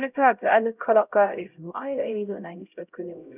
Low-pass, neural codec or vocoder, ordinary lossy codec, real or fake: 3.6 kHz; codec, 16 kHz, 0.5 kbps, X-Codec, HuBERT features, trained on LibriSpeech; none; fake